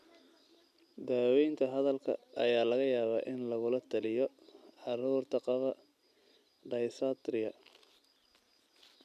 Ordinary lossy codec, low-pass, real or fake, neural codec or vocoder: none; 14.4 kHz; real; none